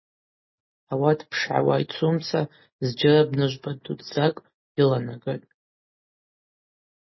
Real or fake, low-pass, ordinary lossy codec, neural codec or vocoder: real; 7.2 kHz; MP3, 24 kbps; none